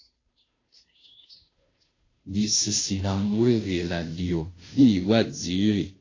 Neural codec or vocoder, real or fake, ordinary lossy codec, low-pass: codec, 16 kHz, 0.5 kbps, FunCodec, trained on Chinese and English, 25 frames a second; fake; AAC, 32 kbps; 7.2 kHz